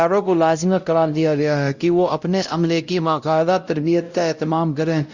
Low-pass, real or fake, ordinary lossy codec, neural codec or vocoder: 7.2 kHz; fake; Opus, 64 kbps; codec, 16 kHz, 0.5 kbps, X-Codec, WavLM features, trained on Multilingual LibriSpeech